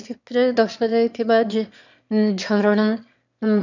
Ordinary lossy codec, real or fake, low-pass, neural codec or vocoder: none; fake; 7.2 kHz; autoencoder, 22.05 kHz, a latent of 192 numbers a frame, VITS, trained on one speaker